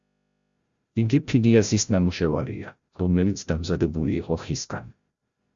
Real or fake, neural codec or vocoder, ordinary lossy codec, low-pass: fake; codec, 16 kHz, 0.5 kbps, FreqCodec, larger model; Opus, 64 kbps; 7.2 kHz